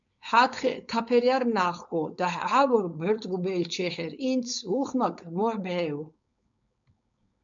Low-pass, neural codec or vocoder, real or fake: 7.2 kHz; codec, 16 kHz, 4.8 kbps, FACodec; fake